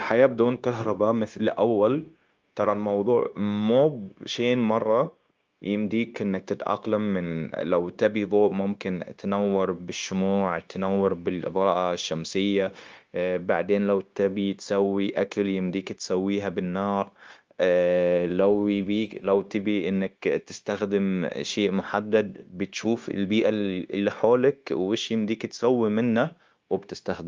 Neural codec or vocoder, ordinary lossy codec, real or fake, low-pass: codec, 16 kHz, 0.9 kbps, LongCat-Audio-Codec; Opus, 24 kbps; fake; 7.2 kHz